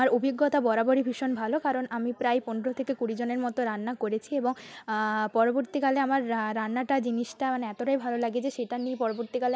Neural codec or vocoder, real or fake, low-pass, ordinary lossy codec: none; real; none; none